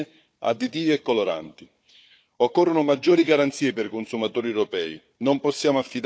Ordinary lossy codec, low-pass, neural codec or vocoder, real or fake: none; none; codec, 16 kHz, 4 kbps, FunCodec, trained on Chinese and English, 50 frames a second; fake